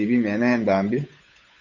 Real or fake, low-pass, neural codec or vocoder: fake; 7.2 kHz; codec, 16 kHz, 8 kbps, FunCodec, trained on Chinese and English, 25 frames a second